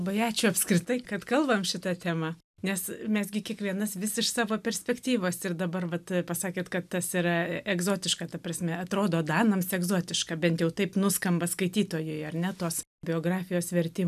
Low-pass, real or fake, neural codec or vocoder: 14.4 kHz; real; none